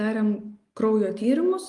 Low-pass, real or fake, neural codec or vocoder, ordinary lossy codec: 10.8 kHz; real; none; Opus, 32 kbps